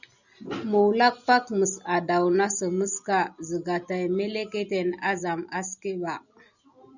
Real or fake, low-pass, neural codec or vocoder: real; 7.2 kHz; none